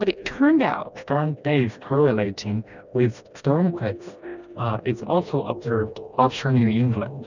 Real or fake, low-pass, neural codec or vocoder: fake; 7.2 kHz; codec, 16 kHz, 1 kbps, FreqCodec, smaller model